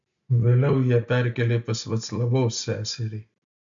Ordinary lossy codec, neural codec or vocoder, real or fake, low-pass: MP3, 64 kbps; none; real; 7.2 kHz